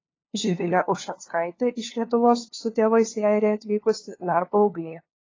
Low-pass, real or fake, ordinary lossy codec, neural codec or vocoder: 7.2 kHz; fake; AAC, 32 kbps; codec, 16 kHz, 2 kbps, FunCodec, trained on LibriTTS, 25 frames a second